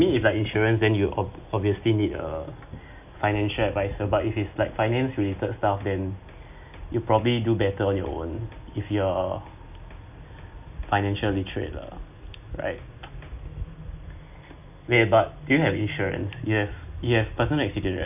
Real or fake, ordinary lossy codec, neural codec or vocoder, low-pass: real; none; none; 3.6 kHz